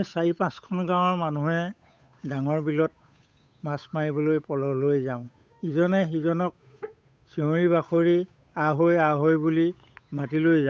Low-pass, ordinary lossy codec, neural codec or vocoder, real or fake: none; none; codec, 16 kHz, 8 kbps, FunCodec, trained on Chinese and English, 25 frames a second; fake